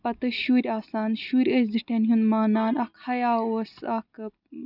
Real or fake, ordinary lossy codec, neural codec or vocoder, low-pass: real; none; none; 5.4 kHz